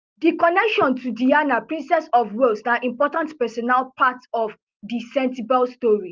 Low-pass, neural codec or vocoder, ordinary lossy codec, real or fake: 7.2 kHz; none; Opus, 32 kbps; real